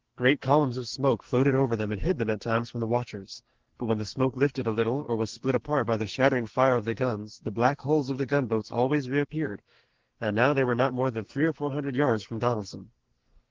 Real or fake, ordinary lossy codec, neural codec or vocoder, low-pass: fake; Opus, 16 kbps; codec, 44.1 kHz, 2.6 kbps, SNAC; 7.2 kHz